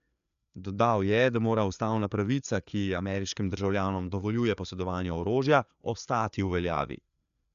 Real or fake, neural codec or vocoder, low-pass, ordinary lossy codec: fake; codec, 16 kHz, 4 kbps, FreqCodec, larger model; 7.2 kHz; none